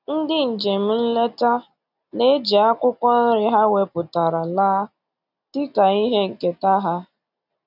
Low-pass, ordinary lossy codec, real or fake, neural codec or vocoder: 5.4 kHz; none; real; none